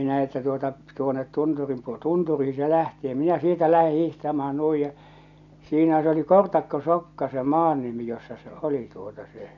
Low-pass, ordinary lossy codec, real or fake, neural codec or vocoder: 7.2 kHz; none; real; none